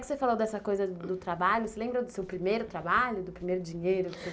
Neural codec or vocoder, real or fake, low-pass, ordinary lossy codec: none; real; none; none